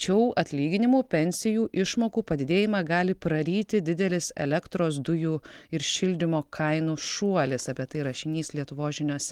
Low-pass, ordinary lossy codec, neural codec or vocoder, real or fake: 19.8 kHz; Opus, 24 kbps; none; real